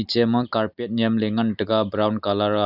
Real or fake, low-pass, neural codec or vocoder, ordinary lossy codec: real; 5.4 kHz; none; none